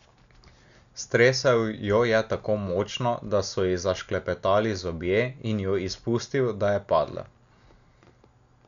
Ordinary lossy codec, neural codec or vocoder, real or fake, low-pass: none; none; real; 7.2 kHz